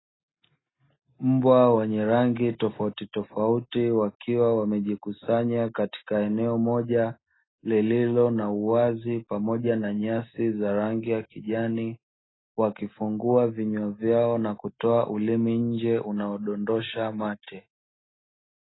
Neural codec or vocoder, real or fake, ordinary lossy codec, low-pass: none; real; AAC, 16 kbps; 7.2 kHz